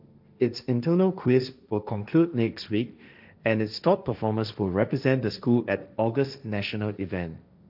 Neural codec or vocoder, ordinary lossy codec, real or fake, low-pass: codec, 16 kHz, 1.1 kbps, Voila-Tokenizer; none; fake; 5.4 kHz